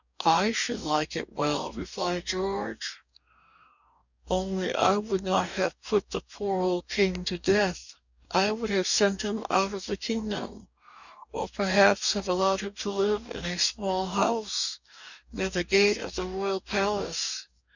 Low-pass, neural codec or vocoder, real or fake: 7.2 kHz; codec, 44.1 kHz, 2.6 kbps, DAC; fake